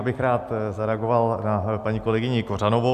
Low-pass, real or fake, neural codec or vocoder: 14.4 kHz; real; none